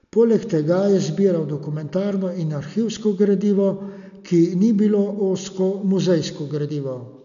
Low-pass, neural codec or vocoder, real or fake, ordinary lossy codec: 7.2 kHz; none; real; none